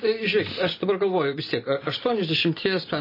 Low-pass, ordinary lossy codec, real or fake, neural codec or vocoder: 5.4 kHz; MP3, 24 kbps; real; none